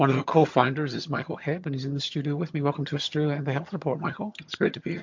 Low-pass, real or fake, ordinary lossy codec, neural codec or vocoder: 7.2 kHz; fake; MP3, 48 kbps; vocoder, 22.05 kHz, 80 mel bands, HiFi-GAN